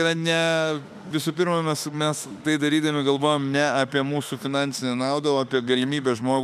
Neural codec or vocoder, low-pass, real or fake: autoencoder, 48 kHz, 32 numbers a frame, DAC-VAE, trained on Japanese speech; 14.4 kHz; fake